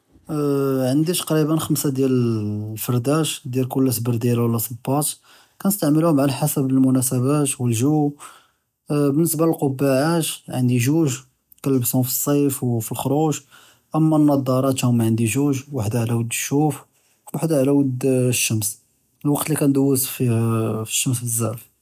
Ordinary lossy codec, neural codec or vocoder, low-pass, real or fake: none; none; 14.4 kHz; real